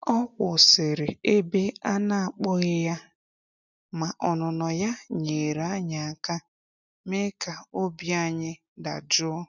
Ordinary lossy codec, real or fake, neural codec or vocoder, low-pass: none; real; none; 7.2 kHz